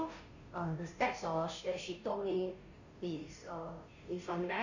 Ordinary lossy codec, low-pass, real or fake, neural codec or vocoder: none; 7.2 kHz; fake; codec, 16 kHz, 0.5 kbps, FunCodec, trained on Chinese and English, 25 frames a second